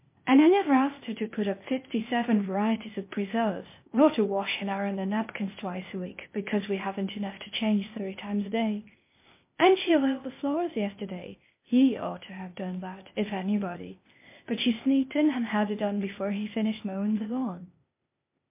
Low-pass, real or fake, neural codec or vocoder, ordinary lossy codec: 3.6 kHz; fake; codec, 16 kHz, 0.8 kbps, ZipCodec; MP3, 24 kbps